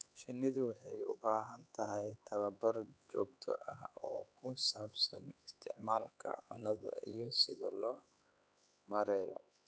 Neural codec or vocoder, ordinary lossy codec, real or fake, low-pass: codec, 16 kHz, 4 kbps, X-Codec, HuBERT features, trained on LibriSpeech; none; fake; none